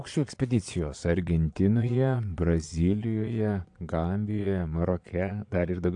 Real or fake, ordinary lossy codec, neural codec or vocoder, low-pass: fake; AAC, 64 kbps; vocoder, 22.05 kHz, 80 mel bands, Vocos; 9.9 kHz